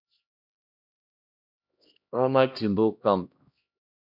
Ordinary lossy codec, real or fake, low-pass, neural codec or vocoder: MP3, 48 kbps; fake; 5.4 kHz; codec, 16 kHz, 1 kbps, X-Codec, HuBERT features, trained on LibriSpeech